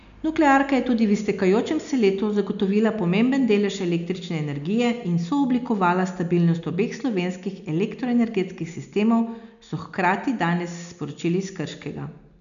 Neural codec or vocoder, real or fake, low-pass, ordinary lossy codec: none; real; 7.2 kHz; none